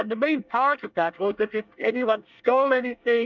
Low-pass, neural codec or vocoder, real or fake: 7.2 kHz; codec, 24 kHz, 1 kbps, SNAC; fake